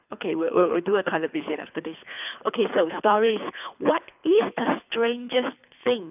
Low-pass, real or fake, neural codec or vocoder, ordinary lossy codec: 3.6 kHz; fake; codec, 24 kHz, 3 kbps, HILCodec; none